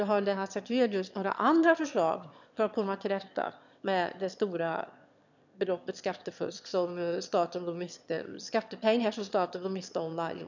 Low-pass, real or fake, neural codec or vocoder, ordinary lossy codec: 7.2 kHz; fake; autoencoder, 22.05 kHz, a latent of 192 numbers a frame, VITS, trained on one speaker; none